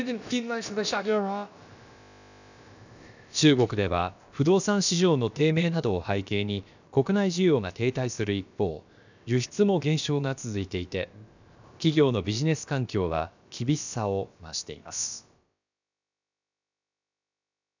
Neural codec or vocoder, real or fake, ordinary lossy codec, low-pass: codec, 16 kHz, about 1 kbps, DyCAST, with the encoder's durations; fake; none; 7.2 kHz